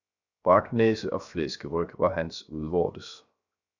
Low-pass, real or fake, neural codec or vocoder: 7.2 kHz; fake; codec, 16 kHz, 0.7 kbps, FocalCodec